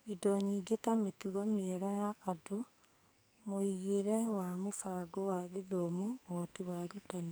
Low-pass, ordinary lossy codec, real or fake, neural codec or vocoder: none; none; fake; codec, 44.1 kHz, 2.6 kbps, SNAC